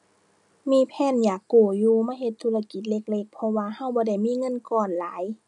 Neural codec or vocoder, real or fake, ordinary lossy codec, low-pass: none; real; none; 10.8 kHz